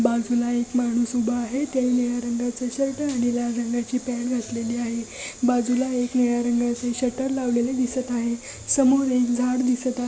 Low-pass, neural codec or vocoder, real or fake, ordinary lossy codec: none; none; real; none